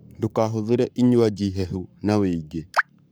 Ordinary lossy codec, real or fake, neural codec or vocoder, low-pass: none; fake; codec, 44.1 kHz, 7.8 kbps, Pupu-Codec; none